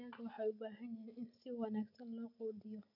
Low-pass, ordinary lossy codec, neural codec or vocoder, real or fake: 5.4 kHz; none; none; real